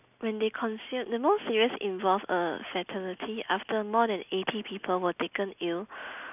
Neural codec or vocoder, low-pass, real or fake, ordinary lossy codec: none; 3.6 kHz; real; none